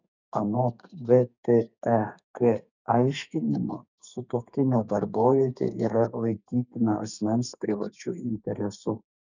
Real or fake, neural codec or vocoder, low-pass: fake; codec, 32 kHz, 1.9 kbps, SNAC; 7.2 kHz